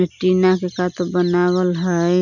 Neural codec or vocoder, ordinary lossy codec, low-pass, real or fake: none; none; 7.2 kHz; real